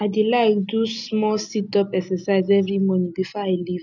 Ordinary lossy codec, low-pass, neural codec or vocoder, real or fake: none; 7.2 kHz; none; real